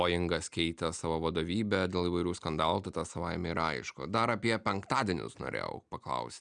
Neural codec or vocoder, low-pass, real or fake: none; 9.9 kHz; real